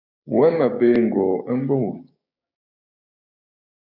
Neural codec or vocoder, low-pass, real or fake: codec, 16 kHz, 6 kbps, DAC; 5.4 kHz; fake